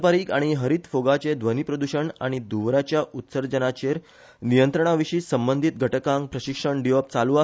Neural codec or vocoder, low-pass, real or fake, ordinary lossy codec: none; none; real; none